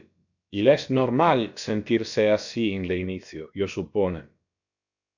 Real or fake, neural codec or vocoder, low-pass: fake; codec, 16 kHz, about 1 kbps, DyCAST, with the encoder's durations; 7.2 kHz